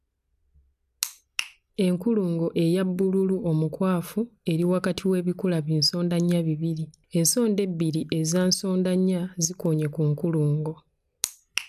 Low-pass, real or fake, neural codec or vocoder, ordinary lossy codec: 14.4 kHz; real; none; none